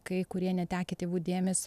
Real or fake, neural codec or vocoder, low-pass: real; none; 14.4 kHz